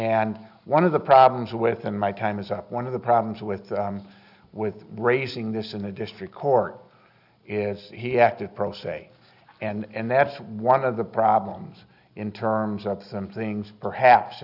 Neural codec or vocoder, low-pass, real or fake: none; 5.4 kHz; real